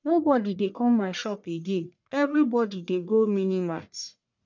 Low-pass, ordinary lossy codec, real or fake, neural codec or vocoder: 7.2 kHz; none; fake; codec, 44.1 kHz, 1.7 kbps, Pupu-Codec